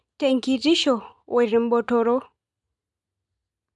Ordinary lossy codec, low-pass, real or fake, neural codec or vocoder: none; 10.8 kHz; real; none